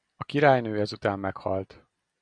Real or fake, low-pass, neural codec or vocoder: real; 9.9 kHz; none